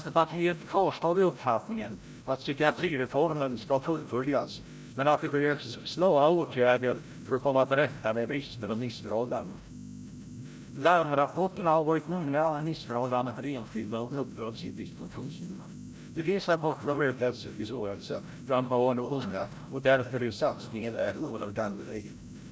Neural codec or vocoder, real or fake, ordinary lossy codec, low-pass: codec, 16 kHz, 0.5 kbps, FreqCodec, larger model; fake; none; none